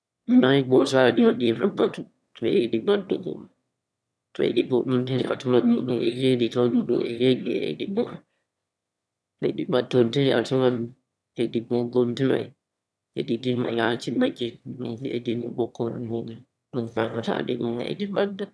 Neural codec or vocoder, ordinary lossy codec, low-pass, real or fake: autoencoder, 22.05 kHz, a latent of 192 numbers a frame, VITS, trained on one speaker; none; none; fake